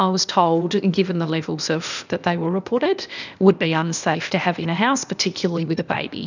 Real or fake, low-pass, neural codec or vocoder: fake; 7.2 kHz; codec, 16 kHz, 0.8 kbps, ZipCodec